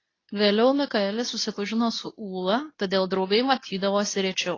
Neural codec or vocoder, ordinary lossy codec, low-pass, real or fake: codec, 24 kHz, 0.9 kbps, WavTokenizer, medium speech release version 2; AAC, 32 kbps; 7.2 kHz; fake